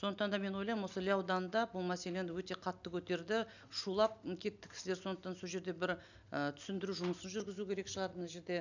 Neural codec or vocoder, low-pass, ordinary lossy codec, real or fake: none; 7.2 kHz; none; real